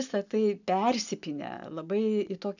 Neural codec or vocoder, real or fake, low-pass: none; real; 7.2 kHz